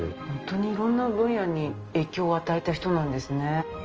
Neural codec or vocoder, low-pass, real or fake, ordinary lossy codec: none; 7.2 kHz; real; Opus, 24 kbps